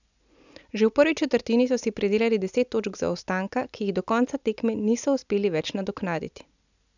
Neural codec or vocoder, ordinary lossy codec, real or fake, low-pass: none; none; real; 7.2 kHz